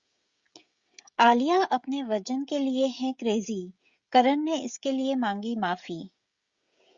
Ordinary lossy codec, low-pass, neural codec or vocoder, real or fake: Opus, 64 kbps; 7.2 kHz; codec, 16 kHz, 16 kbps, FreqCodec, smaller model; fake